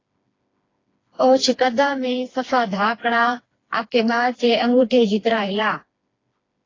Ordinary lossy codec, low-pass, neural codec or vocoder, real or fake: AAC, 32 kbps; 7.2 kHz; codec, 16 kHz, 2 kbps, FreqCodec, smaller model; fake